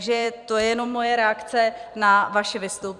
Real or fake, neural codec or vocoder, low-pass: fake; vocoder, 44.1 kHz, 128 mel bands every 256 samples, BigVGAN v2; 10.8 kHz